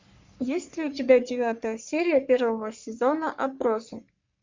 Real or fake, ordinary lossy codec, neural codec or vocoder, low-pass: fake; MP3, 64 kbps; codec, 44.1 kHz, 3.4 kbps, Pupu-Codec; 7.2 kHz